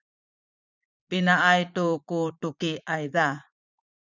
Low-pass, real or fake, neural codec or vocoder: 7.2 kHz; fake; vocoder, 44.1 kHz, 80 mel bands, Vocos